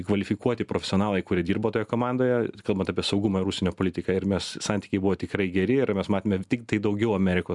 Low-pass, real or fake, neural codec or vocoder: 10.8 kHz; real; none